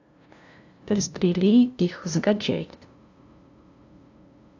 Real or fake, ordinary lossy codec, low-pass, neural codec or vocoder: fake; AAC, 48 kbps; 7.2 kHz; codec, 16 kHz, 0.5 kbps, FunCodec, trained on LibriTTS, 25 frames a second